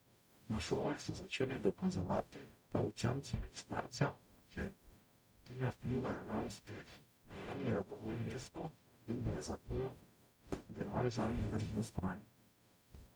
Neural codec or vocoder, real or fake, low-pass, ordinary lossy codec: codec, 44.1 kHz, 0.9 kbps, DAC; fake; none; none